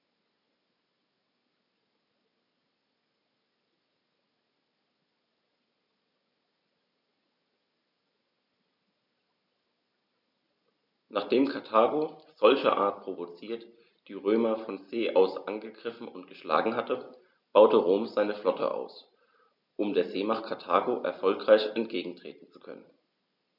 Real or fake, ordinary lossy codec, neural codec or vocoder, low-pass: real; none; none; 5.4 kHz